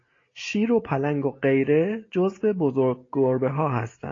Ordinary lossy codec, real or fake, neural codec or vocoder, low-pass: AAC, 32 kbps; real; none; 7.2 kHz